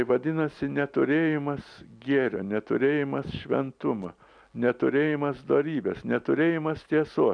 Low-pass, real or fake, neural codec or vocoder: 9.9 kHz; real; none